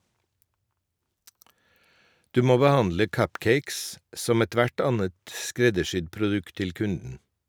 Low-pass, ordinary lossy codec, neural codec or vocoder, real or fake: none; none; none; real